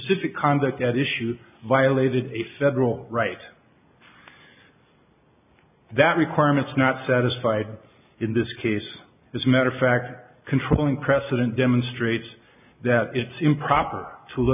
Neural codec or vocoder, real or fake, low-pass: none; real; 3.6 kHz